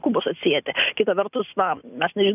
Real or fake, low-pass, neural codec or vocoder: real; 3.6 kHz; none